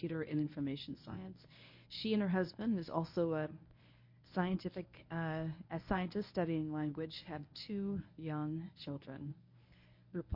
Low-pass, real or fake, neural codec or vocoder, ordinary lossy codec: 5.4 kHz; fake; codec, 24 kHz, 0.9 kbps, WavTokenizer, medium speech release version 1; MP3, 32 kbps